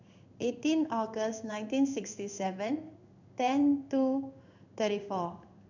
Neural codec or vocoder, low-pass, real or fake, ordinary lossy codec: codec, 16 kHz in and 24 kHz out, 1 kbps, XY-Tokenizer; 7.2 kHz; fake; none